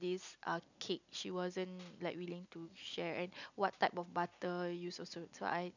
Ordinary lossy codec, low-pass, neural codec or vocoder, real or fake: none; 7.2 kHz; none; real